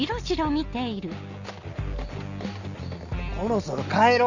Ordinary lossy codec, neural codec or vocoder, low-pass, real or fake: none; none; 7.2 kHz; real